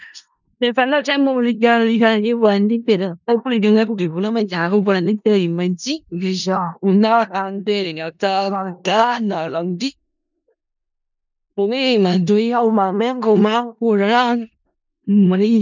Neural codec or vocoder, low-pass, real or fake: codec, 16 kHz in and 24 kHz out, 0.4 kbps, LongCat-Audio-Codec, four codebook decoder; 7.2 kHz; fake